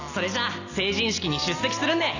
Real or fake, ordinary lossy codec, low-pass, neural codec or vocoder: real; none; 7.2 kHz; none